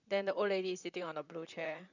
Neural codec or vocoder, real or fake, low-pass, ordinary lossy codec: vocoder, 44.1 kHz, 128 mel bands, Pupu-Vocoder; fake; 7.2 kHz; none